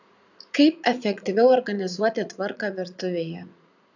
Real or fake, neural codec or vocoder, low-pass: fake; vocoder, 44.1 kHz, 80 mel bands, Vocos; 7.2 kHz